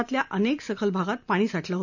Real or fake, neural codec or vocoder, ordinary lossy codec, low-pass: real; none; none; 7.2 kHz